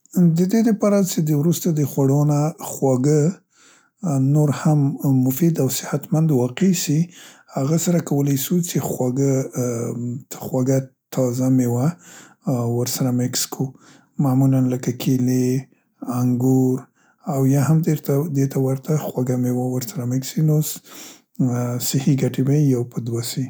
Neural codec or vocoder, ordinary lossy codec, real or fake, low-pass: none; none; real; none